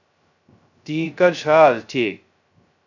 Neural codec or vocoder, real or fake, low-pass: codec, 16 kHz, 0.2 kbps, FocalCodec; fake; 7.2 kHz